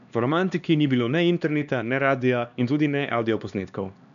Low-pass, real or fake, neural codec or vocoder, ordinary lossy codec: 7.2 kHz; fake; codec, 16 kHz, 2 kbps, X-Codec, HuBERT features, trained on LibriSpeech; none